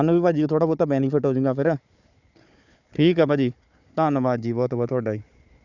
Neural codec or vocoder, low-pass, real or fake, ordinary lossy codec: codec, 16 kHz, 4 kbps, FunCodec, trained on Chinese and English, 50 frames a second; 7.2 kHz; fake; none